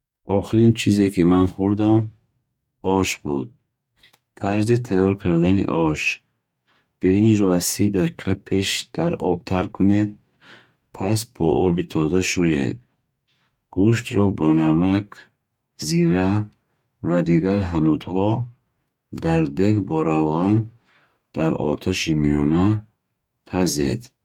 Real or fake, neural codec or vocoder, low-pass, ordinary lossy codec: fake; codec, 44.1 kHz, 2.6 kbps, DAC; 19.8 kHz; MP3, 96 kbps